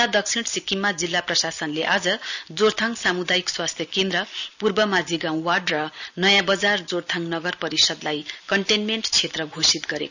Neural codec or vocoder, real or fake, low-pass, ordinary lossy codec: none; real; 7.2 kHz; none